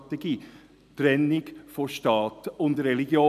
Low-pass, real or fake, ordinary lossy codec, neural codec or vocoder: 14.4 kHz; real; none; none